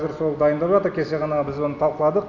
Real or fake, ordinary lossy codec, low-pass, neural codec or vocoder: real; none; 7.2 kHz; none